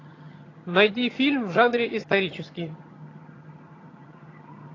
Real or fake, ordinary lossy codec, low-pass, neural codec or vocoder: fake; AAC, 32 kbps; 7.2 kHz; vocoder, 22.05 kHz, 80 mel bands, HiFi-GAN